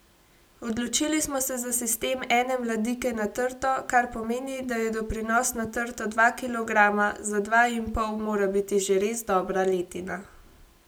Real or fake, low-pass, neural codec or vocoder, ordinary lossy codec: real; none; none; none